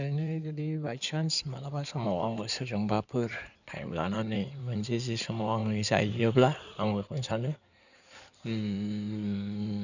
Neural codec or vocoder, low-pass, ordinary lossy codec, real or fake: codec, 16 kHz in and 24 kHz out, 2.2 kbps, FireRedTTS-2 codec; 7.2 kHz; none; fake